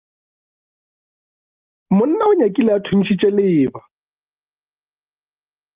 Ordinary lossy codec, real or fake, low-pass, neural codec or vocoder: Opus, 32 kbps; real; 3.6 kHz; none